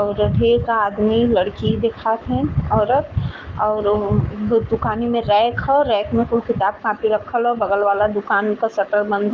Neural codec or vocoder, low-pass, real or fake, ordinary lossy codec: codec, 44.1 kHz, 7.8 kbps, Pupu-Codec; 7.2 kHz; fake; Opus, 24 kbps